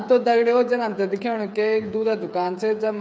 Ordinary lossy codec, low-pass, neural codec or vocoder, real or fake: none; none; codec, 16 kHz, 8 kbps, FreqCodec, smaller model; fake